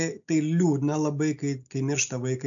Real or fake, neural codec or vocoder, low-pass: real; none; 7.2 kHz